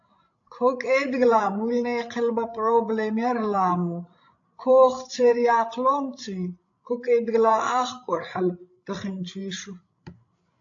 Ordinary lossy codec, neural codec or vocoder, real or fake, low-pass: AAC, 48 kbps; codec, 16 kHz, 16 kbps, FreqCodec, larger model; fake; 7.2 kHz